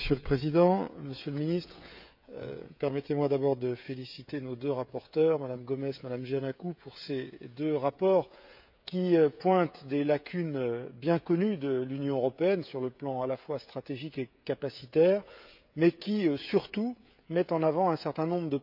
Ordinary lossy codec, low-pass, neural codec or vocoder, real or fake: none; 5.4 kHz; codec, 16 kHz, 16 kbps, FreqCodec, smaller model; fake